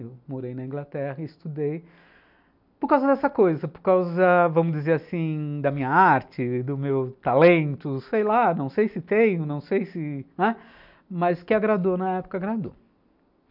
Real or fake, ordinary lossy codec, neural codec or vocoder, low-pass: real; none; none; 5.4 kHz